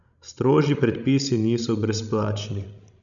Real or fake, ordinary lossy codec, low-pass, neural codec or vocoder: fake; none; 7.2 kHz; codec, 16 kHz, 16 kbps, FreqCodec, larger model